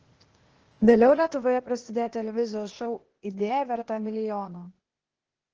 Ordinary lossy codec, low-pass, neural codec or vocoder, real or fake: Opus, 16 kbps; 7.2 kHz; codec, 16 kHz, 0.8 kbps, ZipCodec; fake